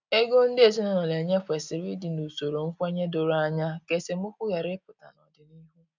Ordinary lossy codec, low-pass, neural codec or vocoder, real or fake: none; 7.2 kHz; none; real